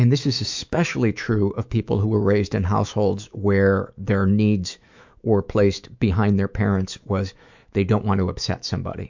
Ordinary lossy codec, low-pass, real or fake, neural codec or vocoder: MP3, 64 kbps; 7.2 kHz; fake; autoencoder, 48 kHz, 128 numbers a frame, DAC-VAE, trained on Japanese speech